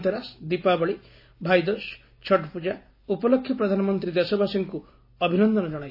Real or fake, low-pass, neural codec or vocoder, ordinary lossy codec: real; 5.4 kHz; none; MP3, 24 kbps